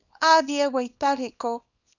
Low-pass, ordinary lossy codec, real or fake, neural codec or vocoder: 7.2 kHz; none; fake; codec, 24 kHz, 0.9 kbps, WavTokenizer, small release